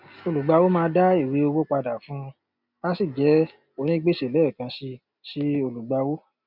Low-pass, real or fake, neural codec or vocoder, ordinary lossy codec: 5.4 kHz; real; none; none